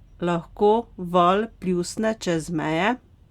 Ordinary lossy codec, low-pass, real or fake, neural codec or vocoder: Opus, 64 kbps; 19.8 kHz; real; none